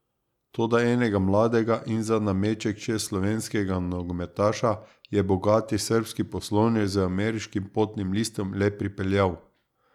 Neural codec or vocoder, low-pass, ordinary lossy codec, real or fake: none; 19.8 kHz; none; real